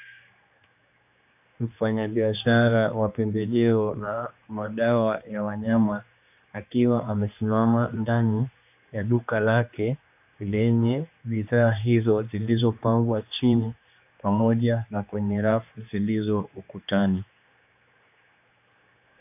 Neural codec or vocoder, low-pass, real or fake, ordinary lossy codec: codec, 16 kHz, 2 kbps, X-Codec, HuBERT features, trained on general audio; 3.6 kHz; fake; AAC, 32 kbps